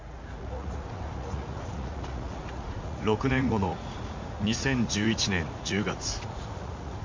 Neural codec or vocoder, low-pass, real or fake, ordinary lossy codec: vocoder, 44.1 kHz, 80 mel bands, Vocos; 7.2 kHz; fake; MP3, 48 kbps